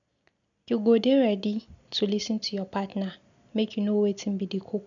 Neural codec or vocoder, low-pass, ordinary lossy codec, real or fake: none; 7.2 kHz; none; real